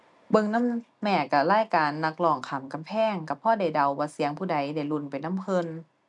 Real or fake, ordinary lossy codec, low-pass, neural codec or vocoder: real; none; 10.8 kHz; none